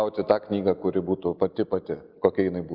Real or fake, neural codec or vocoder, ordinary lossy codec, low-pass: real; none; Opus, 24 kbps; 5.4 kHz